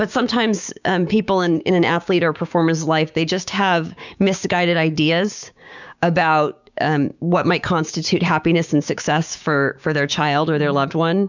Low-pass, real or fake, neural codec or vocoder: 7.2 kHz; fake; codec, 16 kHz, 6 kbps, DAC